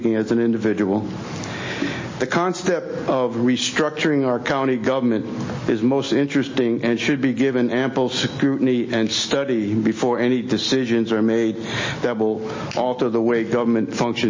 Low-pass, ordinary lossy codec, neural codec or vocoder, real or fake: 7.2 kHz; MP3, 32 kbps; none; real